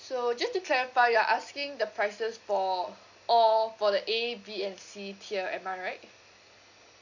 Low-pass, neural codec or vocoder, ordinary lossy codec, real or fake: 7.2 kHz; none; none; real